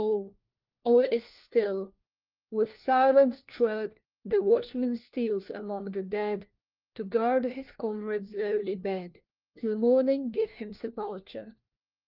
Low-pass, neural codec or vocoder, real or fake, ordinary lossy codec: 5.4 kHz; codec, 16 kHz, 1 kbps, FunCodec, trained on LibriTTS, 50 frames a second; fake; Opus, 24 kbps